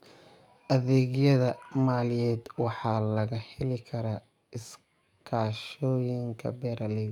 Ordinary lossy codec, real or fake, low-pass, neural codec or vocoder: none; fake; 19.8 kHz; codec, 44.1 kHz, 7.8 kbps, DAC